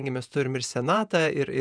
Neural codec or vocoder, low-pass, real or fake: vocoder, 48 kHz, 128 mel bands, Vocos; 9.9 kHz; fake